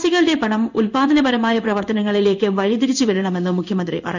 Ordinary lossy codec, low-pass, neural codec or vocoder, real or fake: none; 7.2 kHz; codec, 16 kHz in and 24 kHz out, 1 kbps, XY-Tokenizer; fake